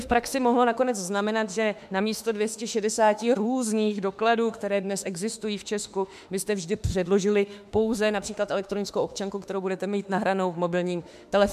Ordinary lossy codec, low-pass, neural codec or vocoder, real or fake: MP3, 96 kbps; 14.4 kHz; autoencoder, 48 kHz, 32 numbers a frame, DAC-VAE, trained on Japanese speech; fake